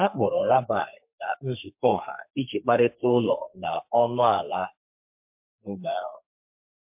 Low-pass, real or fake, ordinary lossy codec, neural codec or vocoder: 3.6 kHz; fake; MP3, 32 kbps; codec, 32 kHz, 1.9 kbps, SNAC